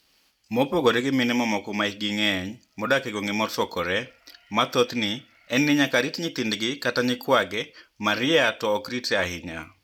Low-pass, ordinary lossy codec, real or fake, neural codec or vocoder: 19.8 kHz; none; fake; vocoder, 44.1 kHz, 128 mel bands every 256 samples, BigVGAN v2